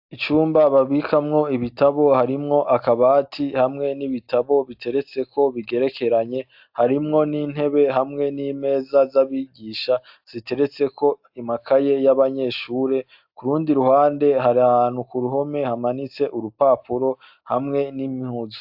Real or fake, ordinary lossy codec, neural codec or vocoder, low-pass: real; AAC, 48 kbps; none; 5.4 kHz